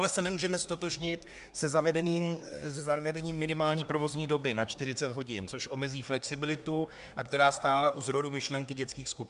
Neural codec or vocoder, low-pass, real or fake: codec, 24 kHz, 1 kbps, SNAC; 10.8 kHz; fake